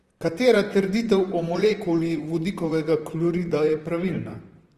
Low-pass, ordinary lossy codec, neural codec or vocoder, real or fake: 14.4 kHz; Opus, 24 kbps; vocoder, 44.1 kHz, 128 mel bands, Pupu-Vocoder; fake